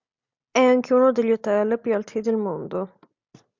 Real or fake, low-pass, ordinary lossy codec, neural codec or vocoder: real; 7.2 kHz; MP3, 64 kbps; none